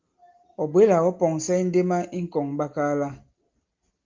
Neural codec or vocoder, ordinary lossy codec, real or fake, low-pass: none; Opus, 32 kbps; real; 7.2 kHz